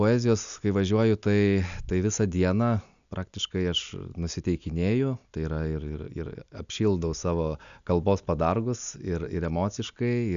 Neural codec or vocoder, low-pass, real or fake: none; 7.2 kHz; real